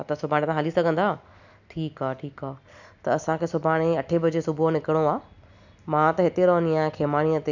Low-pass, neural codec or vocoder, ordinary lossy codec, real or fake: 7.2 kHz; none; none; real